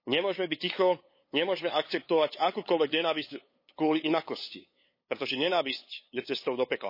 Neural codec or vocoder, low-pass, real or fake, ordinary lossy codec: codec, 16 kHz, 8 kbps, FunCodec, trained on LibriTTS, 25 frames a second; 5.4 kHz; fake; MP3, 24 kbps